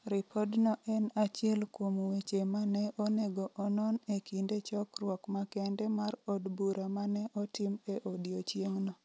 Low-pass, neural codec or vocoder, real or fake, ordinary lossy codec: none; none; real; none